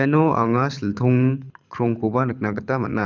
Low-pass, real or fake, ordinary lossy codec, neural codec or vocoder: 7.2 kHz; fake; none; codec, 24 kHz, 6 kbps, HILCodec